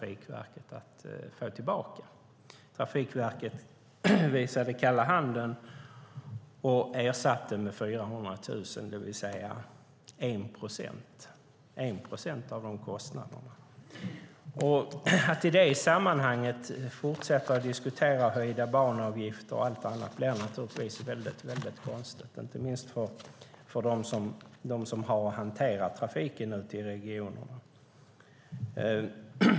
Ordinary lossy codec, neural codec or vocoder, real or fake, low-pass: none; none; real; none